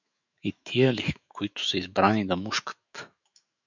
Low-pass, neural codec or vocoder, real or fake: 7.2 kHz; autoencoder, 48 kHz, 128 numbers a frame, DAC-VAE, trained on Japanese speech; fake